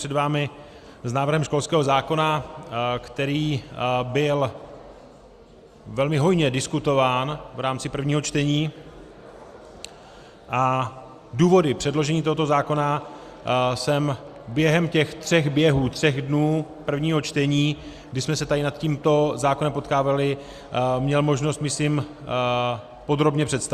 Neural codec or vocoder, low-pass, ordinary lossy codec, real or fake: none; 14.4 kHz; Opus, 64 kbps; real